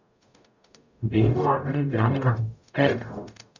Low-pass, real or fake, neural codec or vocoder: 7.2 kHz; fake; codec, 44.1 kHz, 0.9 kbps, DAC